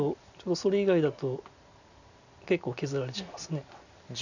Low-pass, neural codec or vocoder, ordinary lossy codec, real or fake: 7.2 kHz; none; none; real